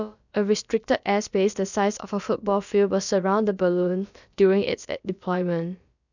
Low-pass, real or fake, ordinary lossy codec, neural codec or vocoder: 7.2 kHz; fake; none; codec, 16 kHz, about 1 kbps, DyCAST, with the encoder's durations